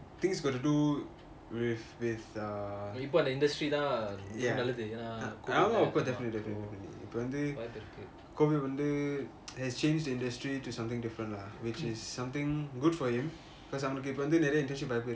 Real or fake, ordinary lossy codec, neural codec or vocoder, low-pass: real; none; none; none